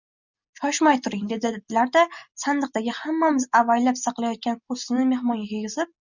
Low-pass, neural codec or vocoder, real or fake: 7.2 kHz; none; real